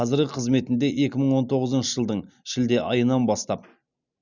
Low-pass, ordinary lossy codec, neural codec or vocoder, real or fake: 7.2 kHz; none; none; real